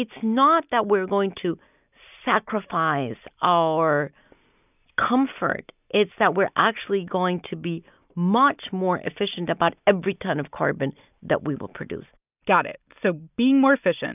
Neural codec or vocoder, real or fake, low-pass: none; real; 3.6 kHz